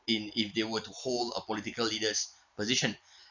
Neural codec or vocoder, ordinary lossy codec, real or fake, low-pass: none; none; real; 7.2 kHz